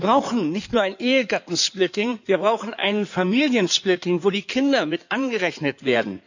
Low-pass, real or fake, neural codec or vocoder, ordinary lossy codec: 7.2 kHz; fake; codec, 16 kHz in and 24 kHz out, 2.2 kbps, FireRedTTS-2 codec; none